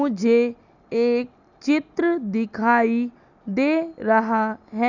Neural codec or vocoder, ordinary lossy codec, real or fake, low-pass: none; none; real; 7.2 kHz